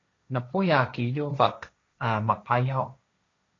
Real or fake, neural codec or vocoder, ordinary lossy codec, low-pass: fake; codec, 16 kHz, 1.1 kbps, Voila-Tokenizer; AAC, 32 kbps; 7.2 kHz